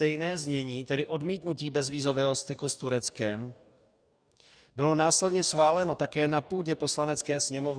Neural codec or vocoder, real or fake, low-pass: codec, 44.1 kHz, 2.6 kbps, DAC; fake; 9.9 kHz